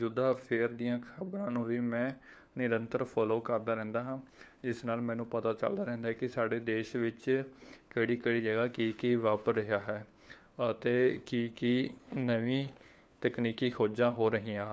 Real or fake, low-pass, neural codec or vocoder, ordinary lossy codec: fake; none; codec, 16 kHz, 4 kbps, FunCodec, trained on LibriTTS, 50 frames a second; none